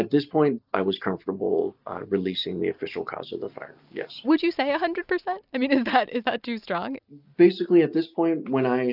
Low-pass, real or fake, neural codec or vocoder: 5.4 kHz; real; none